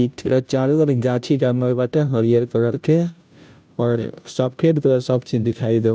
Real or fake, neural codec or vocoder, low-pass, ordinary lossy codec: fake; codec, 16 kHz, 0.5 kbps, FunCodec, trained on Chinese and English, 25 frames a second; none; none